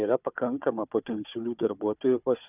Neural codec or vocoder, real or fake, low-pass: codec, 16 kHz, 4 kbps, FunCodec, trained on Chinese and English, 50 frames a second; fake; 3.6 kHz